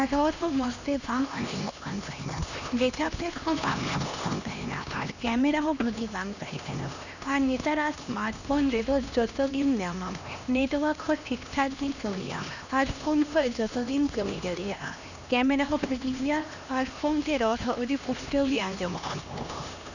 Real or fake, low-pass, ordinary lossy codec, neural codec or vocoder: fake; 7.2 kHz; none; codec, 16 kHz, 2 kbps, X-Codec, HuBERT features, trained on LibriSpeech